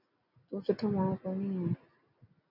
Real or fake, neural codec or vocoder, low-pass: real; none; 5.4 kHz